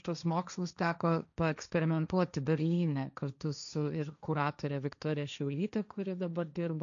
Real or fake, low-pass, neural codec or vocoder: fake; 7.2 kHz; codec, 16 kHz, 1.1 kbps, Voila-Tokenizer